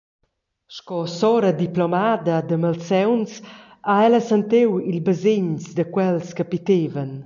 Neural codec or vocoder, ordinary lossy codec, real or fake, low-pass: none; MP3, 64 kbps; real; 7.2 kHz